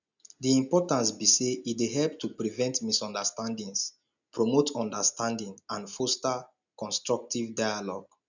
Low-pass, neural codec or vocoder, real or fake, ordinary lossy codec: 7.2 kHz; none; real; none